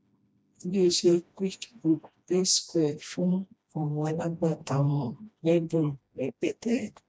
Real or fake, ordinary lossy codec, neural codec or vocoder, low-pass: fake; none; codec, 16 kHz, 1 kbps, FreqCodec, smaller model; none